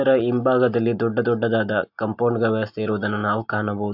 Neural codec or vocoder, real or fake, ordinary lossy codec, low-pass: none; real; AAC, 48 kbps; 5.4 kHz